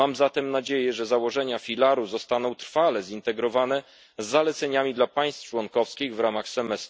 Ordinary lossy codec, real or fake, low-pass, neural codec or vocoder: none; real; none; none